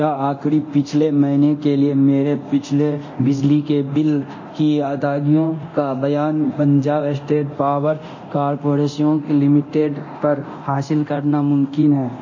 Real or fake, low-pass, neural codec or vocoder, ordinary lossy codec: fake; 7.2 kHz; codec, 24 kHz, 0.9 kbps, DualCodec; MP3, 32 kbps